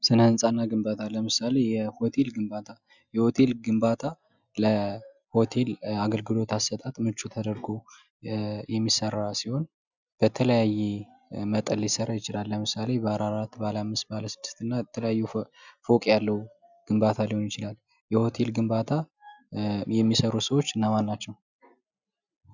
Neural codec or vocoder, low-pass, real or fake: none; 7.2 kHz; real